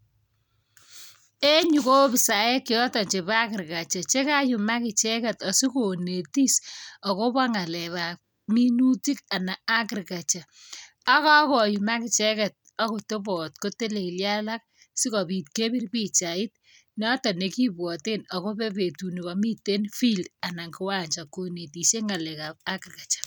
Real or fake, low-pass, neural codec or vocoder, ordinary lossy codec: real; none; none; none